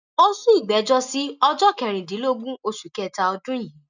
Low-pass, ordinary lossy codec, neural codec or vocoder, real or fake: 7.2 kHz; none; none; real